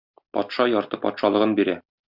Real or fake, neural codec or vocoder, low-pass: real; none; 5.4 kHz